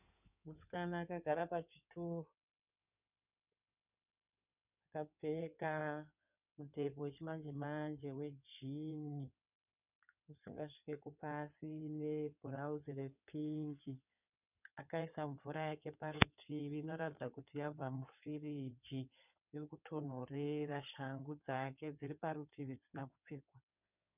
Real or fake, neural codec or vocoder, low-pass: fake; codec, 16 kHz in and 24 kHz out, 2.2 kbps, FireRedTTS-2 codec; 3.6 kHz